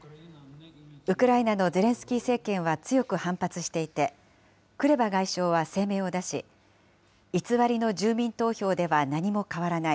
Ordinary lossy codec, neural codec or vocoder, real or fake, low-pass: none; none; real; none